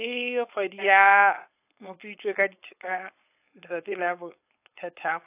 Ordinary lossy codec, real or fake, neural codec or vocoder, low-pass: AAC, 24 kbps; fake; codec, 16 kHz, 4.8 kbps, FACodec; 3.6 kHz